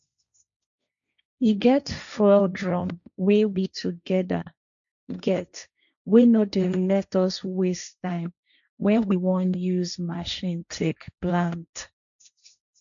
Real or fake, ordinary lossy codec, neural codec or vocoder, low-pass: fake; none; codec, 16 kHz, 1.1 kbps, Voila-Tokenizer; 7.2 kHz